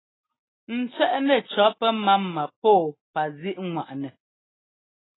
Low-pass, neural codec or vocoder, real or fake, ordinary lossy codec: 7.2 kHz; none; real; AAC, 16 kbps